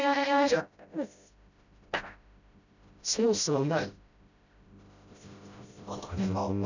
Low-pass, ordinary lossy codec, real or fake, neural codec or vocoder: 7.2 kHz; none; fake; codec, 16 kHz, 0.5 kbps, FreqCodec, smaller model